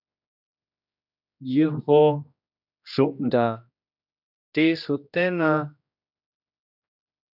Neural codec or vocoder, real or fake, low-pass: codec, 16 kHz, 1 kbps, X-Codec, HuBERT features, trained on general audio; fake; 5.4 kHz